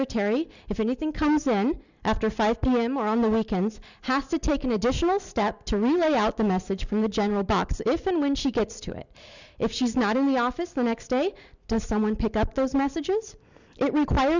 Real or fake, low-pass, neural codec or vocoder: real; 7.2 kHz; none